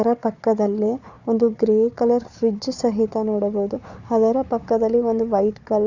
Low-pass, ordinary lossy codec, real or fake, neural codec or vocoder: 7.2 kHz; none; fake; codec, 16 kHz, 4 kbps, FunCodec, trained on Chinese and English, 50 frames a second